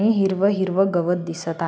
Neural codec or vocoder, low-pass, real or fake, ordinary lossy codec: none; none; real; none